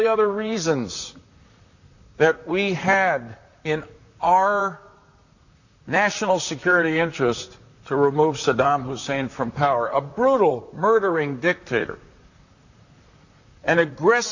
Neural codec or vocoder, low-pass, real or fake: vocoder, 44.1 kHz, 128 mel bands, Pupu-Vocoder; 7.2 kHz; fake